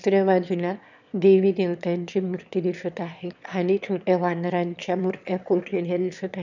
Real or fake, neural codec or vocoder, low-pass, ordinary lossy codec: fake; autoencoder, 22.05 kHz, a latent of 192 numbers a frame, VITS, trained on one speaker; 7.2 kHz; none